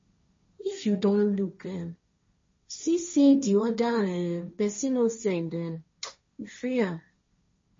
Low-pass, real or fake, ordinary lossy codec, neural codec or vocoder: 7.2 kHz; fake; MP3, 32 kbps; codec, 16 kHz, 1.1 kbps, Voila-Tokenizer